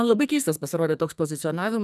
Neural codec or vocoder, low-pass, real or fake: codec, 44.1 kHz, 2.6 kbps, SNAC; 14.4 kHz; fake